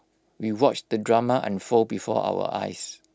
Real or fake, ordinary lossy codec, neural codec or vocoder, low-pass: real; none; none; none